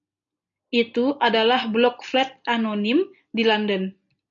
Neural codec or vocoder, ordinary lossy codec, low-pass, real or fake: none; AAC, 64 kbps; 7.2 kHz; real